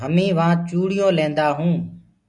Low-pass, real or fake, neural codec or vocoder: 10.8 kHz; real; none